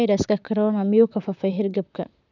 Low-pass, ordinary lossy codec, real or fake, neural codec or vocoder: 7.2 kHz; none; fake; vocoder, 44.1 kHz, 128 mel bands, Pupu-Vocoder